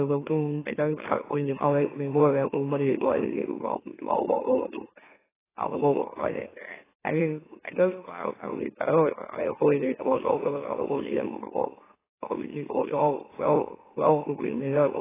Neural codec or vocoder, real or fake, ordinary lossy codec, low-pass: autoencoder, 44.1 kHz, a latent of 192 numbers a frame, MeloTTS; fake; AAC, 16 kbps; 3.6 kHz